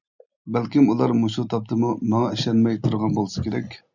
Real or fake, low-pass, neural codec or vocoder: real; 7.2 kHz; none